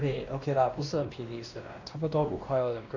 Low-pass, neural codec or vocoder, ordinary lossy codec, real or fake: 7.2 kHz; codec, 16 kHz, 1 kbps, X-Codec, WavLM features, trained on Multilingual LibriSpeech; none; fake